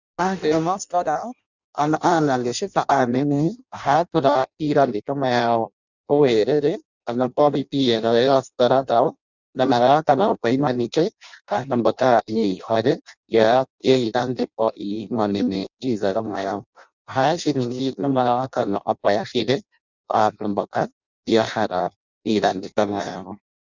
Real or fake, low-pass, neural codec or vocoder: fake; 7.2 kHz; codec, 16 kHz in and 24 kHz out, 0.6 kbps, FireRedTTS-2 codec